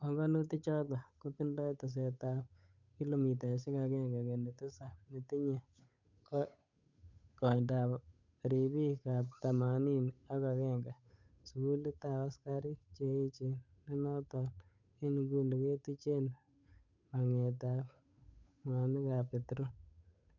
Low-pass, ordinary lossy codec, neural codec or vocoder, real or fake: 7.2 kHz; none; codec, 16 kHz, 8 kbps, FunCodec, trained on Chinese and English, 25 frames a second; fake